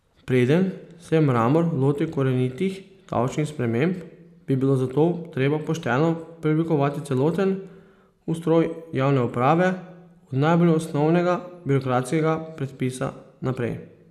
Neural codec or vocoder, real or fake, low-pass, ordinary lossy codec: none; real; 14.4 kHz; none